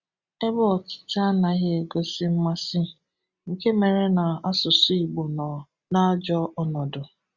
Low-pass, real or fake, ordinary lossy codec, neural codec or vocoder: 7.2 kHz; real; Opus, 64 kbps; none